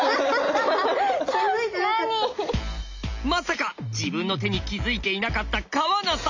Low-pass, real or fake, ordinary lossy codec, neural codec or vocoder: 7.2 kHz; real; MP3, 64 kbps; none